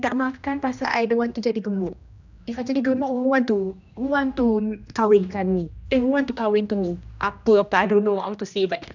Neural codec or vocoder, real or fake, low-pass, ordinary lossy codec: codec, 16 kHz, 1 kbps, X-Codec, HuBERT features, trained on general audio; fake; 7.2 kHz; none